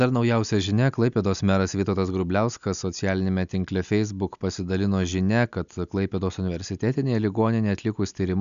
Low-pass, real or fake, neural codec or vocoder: 7.2 kHz; real; none